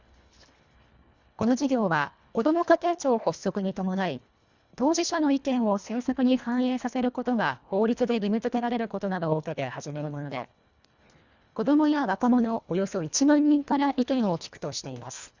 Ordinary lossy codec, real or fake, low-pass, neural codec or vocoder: Opus, 64 kbps; fake; 7.2 kHz; codec, 24 kHz, 1.5 kbps, HILCodec